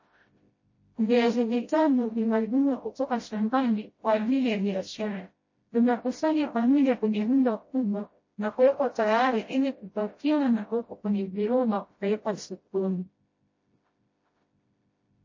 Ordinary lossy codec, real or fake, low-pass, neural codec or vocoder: MP3, 32 kbps; fake; 7.2 kHz; codec, 16 kHz, 0.5 kbps, FreqCodec, smaller model